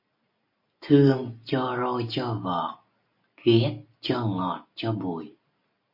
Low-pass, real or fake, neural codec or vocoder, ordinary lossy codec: 5.4 kHz; real; none; MP3, 32 kbps